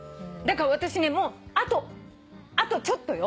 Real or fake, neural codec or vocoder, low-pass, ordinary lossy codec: real; none; none; none